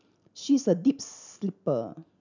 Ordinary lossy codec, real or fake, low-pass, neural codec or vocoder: none; real; 7.2 kHz; none